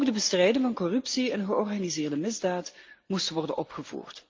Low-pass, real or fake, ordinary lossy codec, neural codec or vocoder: 7.2 kHz; real; Opus, 24 kbps; none